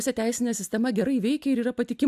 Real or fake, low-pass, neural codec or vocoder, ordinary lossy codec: real; 14.4 kHz; none; AAC, 96 kbps